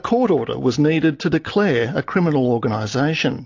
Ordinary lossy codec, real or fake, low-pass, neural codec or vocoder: AAC, 48 kbps; real; 7.2 kHz; none